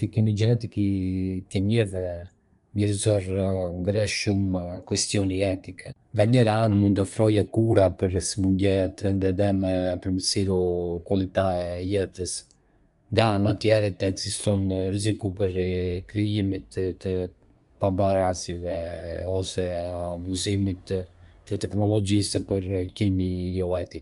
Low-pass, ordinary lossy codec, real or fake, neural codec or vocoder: 10.8 kHz; none; fake; codec, 24 kHz, 1 kbps, SNAC